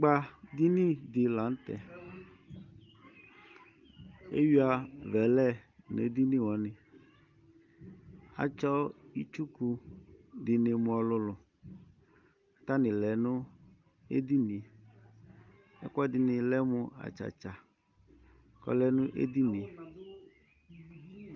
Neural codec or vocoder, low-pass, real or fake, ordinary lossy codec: none; 7.2 kHz; real; Opus, 32 kbps